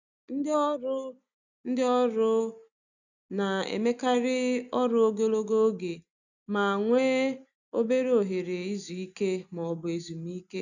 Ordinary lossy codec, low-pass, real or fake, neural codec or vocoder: none; 7.2 kHz; real; none